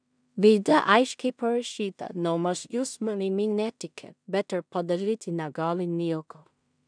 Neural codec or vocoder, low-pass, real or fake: codec, 16 kHz in and 24 kHz out, 0.4 kbps, LongCat-Audio-Codec, two codebook decoder; 9.9 kHz; fake